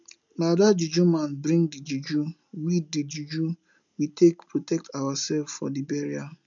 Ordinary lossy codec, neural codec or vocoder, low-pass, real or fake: none; none; 7.2 kHz; real